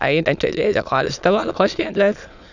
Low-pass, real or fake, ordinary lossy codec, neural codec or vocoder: 7.2 kHz; fake; none; autoencoder, 22.05 kHz, a latent of 192 numbers a frame, VITS, trained on many speakers